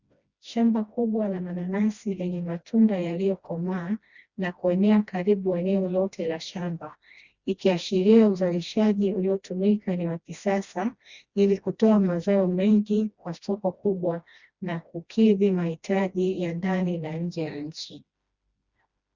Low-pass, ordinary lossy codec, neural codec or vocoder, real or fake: 7.2 kHz; Opus, 64 kbps; codec, 16 kHz, 1 kbps, FreqCodec, smaller model; fake